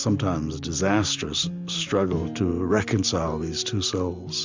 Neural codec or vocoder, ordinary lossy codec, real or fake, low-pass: none; MP3, 64 kbps; real; 7.2 kHz